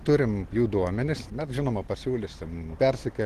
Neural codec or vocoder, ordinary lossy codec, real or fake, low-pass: none; Opus, 16 kbps; real; 14.4 kHz